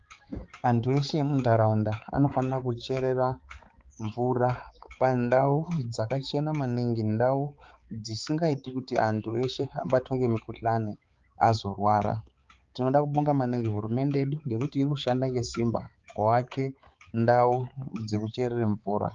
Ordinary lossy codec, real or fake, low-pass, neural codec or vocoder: Opus, 32 kbps; fake; 7.2 kHz; codec, 16 kHz, 4 kbps, X-Codec, HuBERT features, trained on balanced general audio